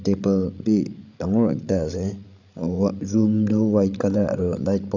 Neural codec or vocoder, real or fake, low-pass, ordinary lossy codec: codec, 16 kHz, 16 kbps, FreqCodec, larger model; fake; 7.2 kHz; none